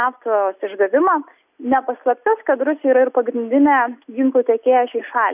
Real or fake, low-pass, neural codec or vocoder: real; 3.6 kHz; none